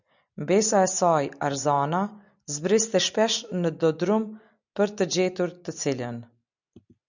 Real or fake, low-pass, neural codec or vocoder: real; 7.2 kHz; none